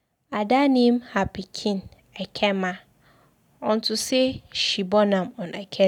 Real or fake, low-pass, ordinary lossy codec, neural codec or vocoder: real; 19.8 kHz; none; none